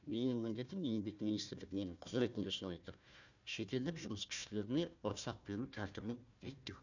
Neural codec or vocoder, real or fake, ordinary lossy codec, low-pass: codec, 16 kHz, 1 kbps, FunCodec, trained on Chinese and English, 50 frames a second; fake; none; 7.2 kHz